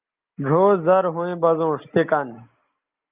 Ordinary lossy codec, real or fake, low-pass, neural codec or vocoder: Opus, 24 kbps; real; 3.6 kHz; none